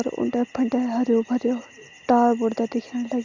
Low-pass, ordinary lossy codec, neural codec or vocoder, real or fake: 7.2 kHz; Opus, 64 kbps; none; real